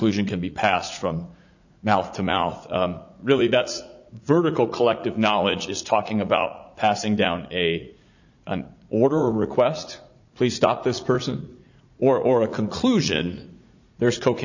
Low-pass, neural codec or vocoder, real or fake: 7.2 kHz; vocoder, 44.1 kHz, 80 mel bands, Vocos; fake